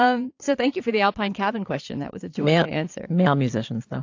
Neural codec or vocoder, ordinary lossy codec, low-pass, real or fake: vocoder, 22.05 kHz, 80 mel bands, Vocos; AAC, 48 kbps; 7.2 kHz; fake